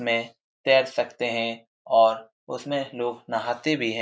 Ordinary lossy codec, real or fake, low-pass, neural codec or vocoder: none; real; none; none